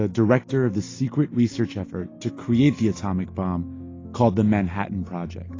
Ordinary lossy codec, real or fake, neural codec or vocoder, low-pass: AAC, 32 kbps; real; none; 7.2 kHz